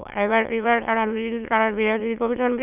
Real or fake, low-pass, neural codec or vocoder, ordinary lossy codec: fake; 3.6 kHz; autoencoder, 22.05 kHz, a latent of 192 numbers a frame, VITS, trained on many speakers; none